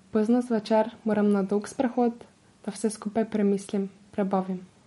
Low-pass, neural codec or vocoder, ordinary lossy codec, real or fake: 19.8 kHz; none; MP3, 48 kbps; real